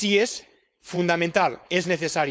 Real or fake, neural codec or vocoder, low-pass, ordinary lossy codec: fake; codec, 16 kHz, 4.8 kbps, FACodec; none; none